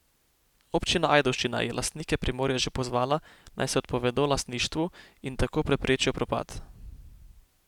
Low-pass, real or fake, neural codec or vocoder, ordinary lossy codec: 19.8 kHz; real; none; none